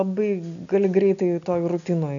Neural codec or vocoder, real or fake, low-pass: none; real; 7.2 kHz